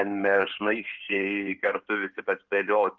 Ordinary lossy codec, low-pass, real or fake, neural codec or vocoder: Opus, 32 kbps; 7.2 kHz; fake; codec, 16 kHz in and 24 kHz out, 2.2 kbps, FireRedTTS-2 codec